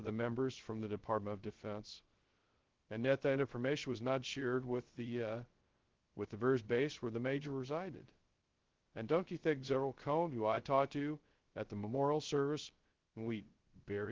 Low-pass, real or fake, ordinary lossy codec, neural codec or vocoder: 7.2 kHz; fake; Opus, 16 kbps; codec, 16 kHz, 0.2 kbps, FocalCodec